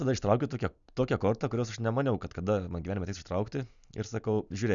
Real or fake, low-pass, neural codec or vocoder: real; 7.2 kHz; none